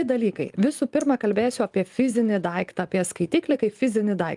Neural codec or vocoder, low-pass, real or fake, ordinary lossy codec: vocoder, 44.1 kHz, 128 mel bands every 512 samples, BigVGAN v2; 10.8 kHz; fake; Opus, 32 kbps